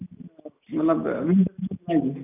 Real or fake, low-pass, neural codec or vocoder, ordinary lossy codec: real; 3.6 kHz; none; none